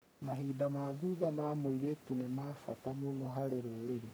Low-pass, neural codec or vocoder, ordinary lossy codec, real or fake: none; codec, 44.1 kHz, 3.4 kbps, Pupu-Codec; none; fake